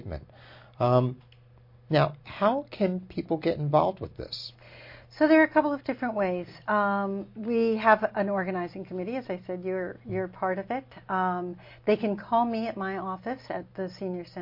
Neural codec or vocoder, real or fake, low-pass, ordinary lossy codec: none; real; 5.4 kHz; MP3, 32 kbps